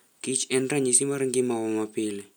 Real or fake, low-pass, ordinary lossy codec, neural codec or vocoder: real; none; none; none